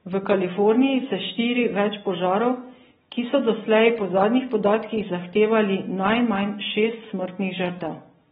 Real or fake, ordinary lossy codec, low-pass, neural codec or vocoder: real; AAC, 16 kbps; 19.8 kHz; none